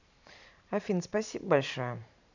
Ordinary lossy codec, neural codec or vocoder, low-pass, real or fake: none; vocoder, 44.1 kHz, 128 mel bands every 512 samples, BigVGAN v2; 7.2 kHz; fake